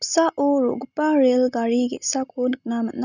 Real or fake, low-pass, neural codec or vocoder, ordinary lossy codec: real; 7.2 kHz; none; none